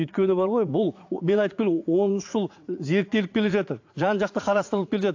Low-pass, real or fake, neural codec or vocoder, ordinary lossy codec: 7.2 kHz; fake; vocoder, 44.1 kHz, 80 mel bands, Vocos; AAC, 48 kbps